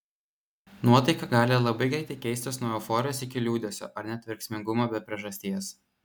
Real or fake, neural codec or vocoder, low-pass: real; none; 19.8 kHz